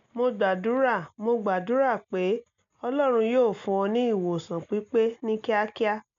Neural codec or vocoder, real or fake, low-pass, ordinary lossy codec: none; real; 7.2 kHz; MP3, 64 kbps